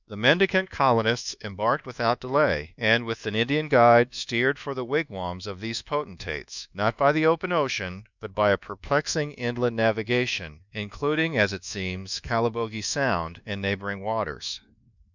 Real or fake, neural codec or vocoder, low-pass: fake; codec, 24 kHz, 1.2 kbps, DualCodec; 7.2 kHz